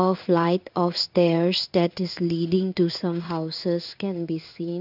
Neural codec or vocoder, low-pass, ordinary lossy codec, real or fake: codec, 16 kHz in and 24 kHz out, 1 kbps, XY-Tokenizer; 5.4 kHz; none; fake